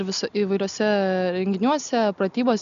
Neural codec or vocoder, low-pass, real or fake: none; 7.2 kHz; real